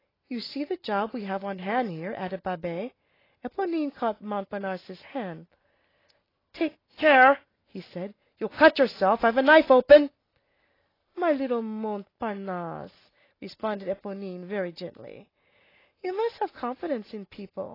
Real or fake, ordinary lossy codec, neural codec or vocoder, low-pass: real; AAC, 24 kbps; none; 5.4 kHz